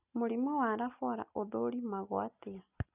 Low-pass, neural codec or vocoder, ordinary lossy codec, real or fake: 3.6 kHz; none; none; real